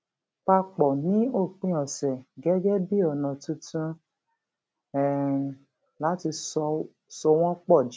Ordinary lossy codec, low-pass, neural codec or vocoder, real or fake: none; none; none; real